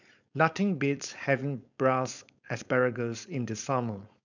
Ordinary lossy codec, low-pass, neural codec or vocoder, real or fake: none; 7.2 kHz; codec, 16 kHz, 4.8 kbps, FACodec; fake